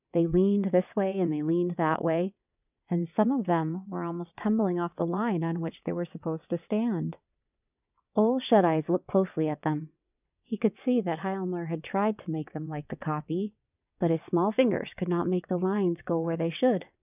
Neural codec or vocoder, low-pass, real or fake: vocoder, 22.05 kHz, 80 mel bands, WaveNeXt; 3.6 kHz; fake